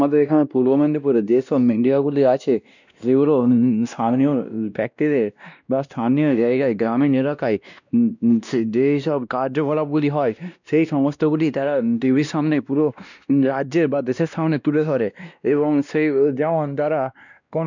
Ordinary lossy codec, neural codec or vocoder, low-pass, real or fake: none; codec, 16 kHz, 1 kbps, X-Codec, WavLM features, trained on Multilingual LibriSpeech; 7.2 kHz; fake